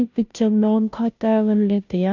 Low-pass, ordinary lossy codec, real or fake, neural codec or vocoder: 7.2 kHz; none; fake; codec, 16 kHz, 0.5 kbps, FunCodec, trained on Chinese and English, 25 frames a second